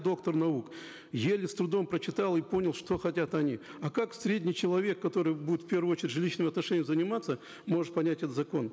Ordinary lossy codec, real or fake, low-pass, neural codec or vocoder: none; real; none; none